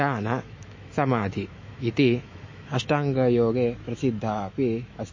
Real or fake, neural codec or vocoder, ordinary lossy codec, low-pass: fake; autoencoder, 48 kHz, 128 numbers a frame, DAC-VAE, trained on Japanese speech; MP3, 32 kbps; 7.2 kHz